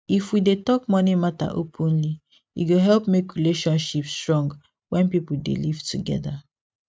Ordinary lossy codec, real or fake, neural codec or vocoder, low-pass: none; real; none; none